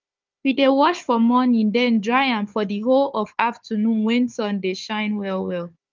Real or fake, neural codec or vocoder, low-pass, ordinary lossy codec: fake; codec, 16 kHz, 4 kbps, FunCodec, trained on Chinese and English, 50 frames a second; 7.2 kHz; Opus, 24 kbps